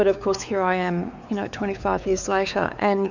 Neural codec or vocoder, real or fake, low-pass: codec, 16 kHz, 4 kbps, X-Codec, WavLM features, trained on Multilingual LibriSpeech; fake; 7.2 kHz